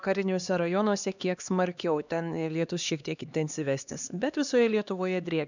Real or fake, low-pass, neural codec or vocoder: fake; 7.2 kHz; codec, 16 kHz, 2 kbps, X-Codec, HuBERT features, trained on LibriSpeech